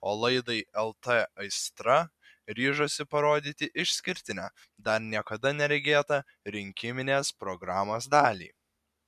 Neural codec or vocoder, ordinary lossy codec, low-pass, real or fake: none; MP3, 96 kbps; 14.4 kHz; real